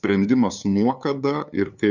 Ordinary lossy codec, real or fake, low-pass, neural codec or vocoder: Opus, 64 kbps; fake; 7.2 kHz; codec, 16 kHz, 2 kbps, FunCodec, trained on LibriTTS, 25 frames a second